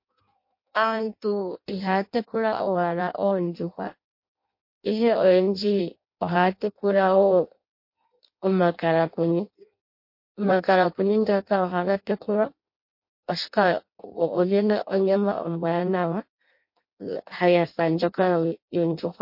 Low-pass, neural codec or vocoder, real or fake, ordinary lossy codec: 5.4 kHz; codec, 16 kHz in and 24 kHz out, 0.6 kbps, FireRedTTS-2 codec; fake; MP3, 32 kbps